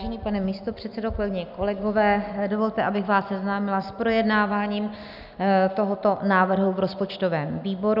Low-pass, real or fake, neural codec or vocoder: 5.4 kHz; real; none